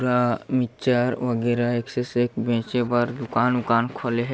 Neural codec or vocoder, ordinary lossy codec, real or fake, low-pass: none; none; real; none